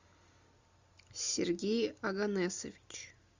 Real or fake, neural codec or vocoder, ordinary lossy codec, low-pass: real; none; Opus, 64 kbps; 7.2 kHz